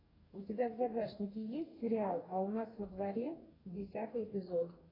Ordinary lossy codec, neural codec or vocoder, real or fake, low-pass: AAC, 24 kbps; codec, 44.1 kHz, 2.6 kbps, DAC; fake; 5.4 kHz